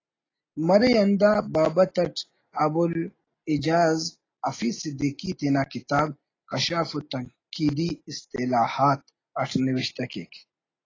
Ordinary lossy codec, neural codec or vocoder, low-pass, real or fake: AAC, 32 kbps; none; 7.2 kHz; real